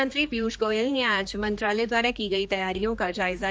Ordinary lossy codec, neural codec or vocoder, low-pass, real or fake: none; codec, 16 kHz, 2 kbps, X-Codec, HuBERT features, trained on general audio; none; fake